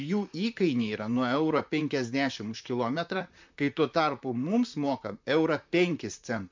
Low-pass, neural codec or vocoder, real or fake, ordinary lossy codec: 7.2 kHz; vocoder, 44.1 kHz, 128 mel bands, Pupu-Vocoder; fake; MP3, 64 kbps